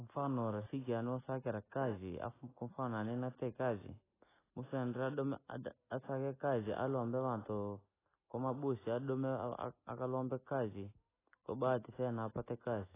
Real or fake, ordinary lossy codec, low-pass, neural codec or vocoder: real; AAC, 16 kbps; 3.6 kHz; none